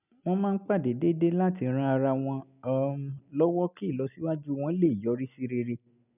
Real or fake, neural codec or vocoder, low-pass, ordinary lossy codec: real; none; 3.6 kHz; none